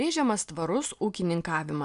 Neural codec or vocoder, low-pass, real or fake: none; 10.8 kHz; real